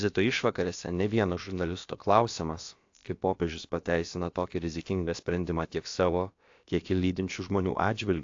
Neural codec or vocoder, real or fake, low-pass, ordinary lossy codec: codec, 16 kHz, about 1 kbps, DyCAST, with the encoder's durations; fake; 7.2 kHz; AAC, 48 kbps